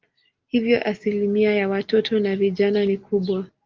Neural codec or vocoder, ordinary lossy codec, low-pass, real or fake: none; Opus, 24 kbps; 7.2 kHz; real